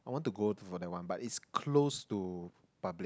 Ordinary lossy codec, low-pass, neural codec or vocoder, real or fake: none; none; none; real